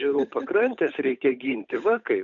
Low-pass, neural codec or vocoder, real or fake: 7.2 kHz; codec, 16 kHz, 8 kbps, FunCodec, trained on Chinese and English, 25 frames a second; fake